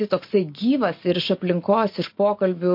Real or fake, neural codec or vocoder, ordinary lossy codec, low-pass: real; none; MP3, 32 kbps; 5.4 kHz